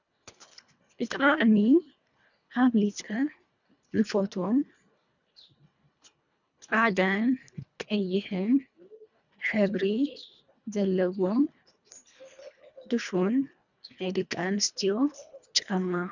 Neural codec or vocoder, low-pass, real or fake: codec, 24 kHz, 1.5 kbps, HILCodec; 7.2 kHz; fake